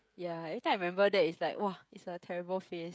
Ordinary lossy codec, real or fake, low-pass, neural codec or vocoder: none; fake; none; codec, 16 kHz, 16 kbps, FreqCodec, smaller model